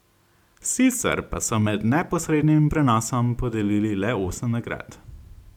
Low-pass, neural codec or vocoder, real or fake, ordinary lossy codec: 19.8 kHz; none; real; none